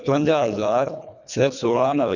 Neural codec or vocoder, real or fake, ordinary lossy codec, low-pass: codec, 24 kHz, 1.5 kbps, HILCodec; fake; none; 7.2 kHz